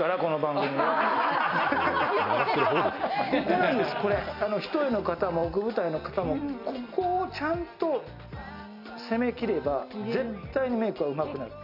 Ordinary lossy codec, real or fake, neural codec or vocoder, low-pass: none; real; none; 5.4 kHz